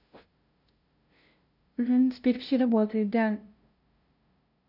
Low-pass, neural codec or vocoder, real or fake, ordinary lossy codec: 5.4 kHz; codec, 16 kHz, 0.5 kbps, FunCodec, trained on LibriTTS, 25 frames a second; fake; none